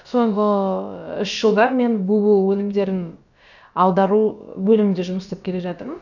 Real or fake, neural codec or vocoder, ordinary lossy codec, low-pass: fake; codec, 16 kHz, about 1 kbps, DyCAST, with the encoder's durations; none; 7.2 kHz